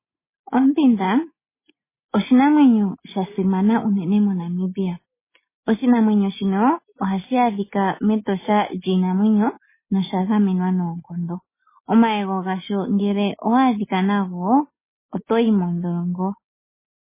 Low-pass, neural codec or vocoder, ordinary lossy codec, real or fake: 3.6 kHz; none; MP3, 16 kbps; real